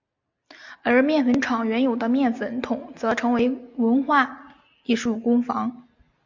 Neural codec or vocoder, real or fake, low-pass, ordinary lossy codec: none; real; 7.2 kHz; MP3, 64 kbps